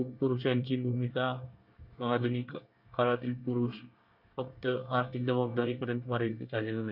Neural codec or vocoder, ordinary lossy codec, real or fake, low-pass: codec, 24 kHz, 1 kbps, SNAC; none; fake; 5.4 kHz